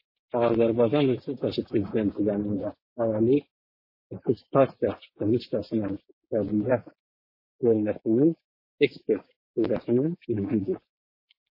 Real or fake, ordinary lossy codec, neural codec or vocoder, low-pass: fake; MP3, 24 kbps; codec, 16 kHz, 6 kbps, DAC; 5.4 kHz